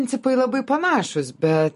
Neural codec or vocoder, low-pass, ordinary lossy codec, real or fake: none; 10.8 kHz; MP3, 48 kbps; real